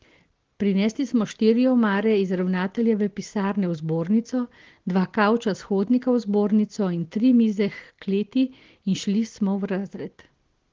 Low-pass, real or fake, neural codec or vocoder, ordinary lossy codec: 7.2 kHz; real; none; Opus, 16 kbps